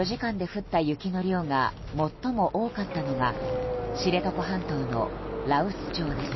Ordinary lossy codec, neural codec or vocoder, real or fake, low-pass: MP3, 24 kbps; none; real; 7.2 kHz